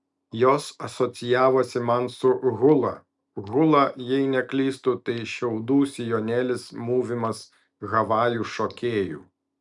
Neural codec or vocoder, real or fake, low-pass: none; real; 10.8 kHz